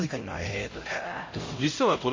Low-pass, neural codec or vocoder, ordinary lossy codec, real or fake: 7.2 kHz; codec, 16 kHz, 0.5 kbps, X-Codec, HuBERT features, trained on LibriSpeech; MP3, 32 kbps; fake